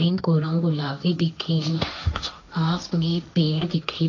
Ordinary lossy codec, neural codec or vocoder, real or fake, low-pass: none; codec, 16 kHz, 1.1 kbps, Voila-Tokenizer; fake; 7.2 kHz